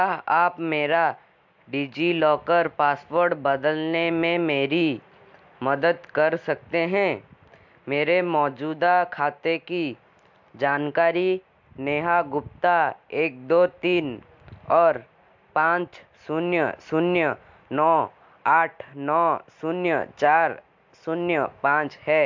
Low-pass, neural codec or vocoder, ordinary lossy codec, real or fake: 7.2 kHz; none; MP3, 64 kbps; real